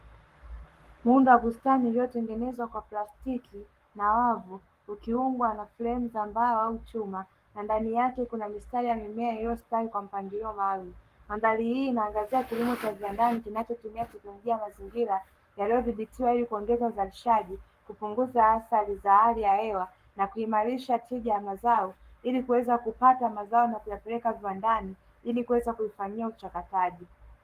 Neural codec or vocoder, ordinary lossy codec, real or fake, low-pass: codec, 44.1 kHz, 7.8 kbps, Pupu-Codec; Opus, 24 kbps; fake; 14.4 kHz